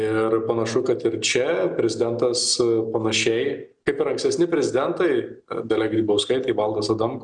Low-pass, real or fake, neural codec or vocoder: 9.9 kHz; real; none